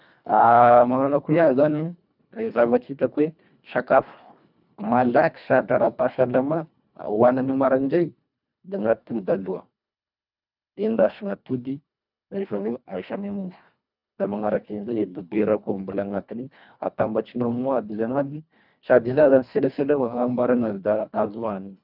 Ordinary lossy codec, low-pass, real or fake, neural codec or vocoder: none; 5.4 kHz; fake; codec, 24 kHz, 1.5 kbps, HILCodec